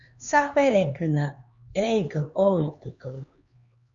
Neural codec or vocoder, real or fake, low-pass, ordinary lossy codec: codec, 16 kHz, 2 kbps, X-Codec, HuBERT features, trained on LibriSpeech; fake; 7.2 kHz; Opus, 64 kbps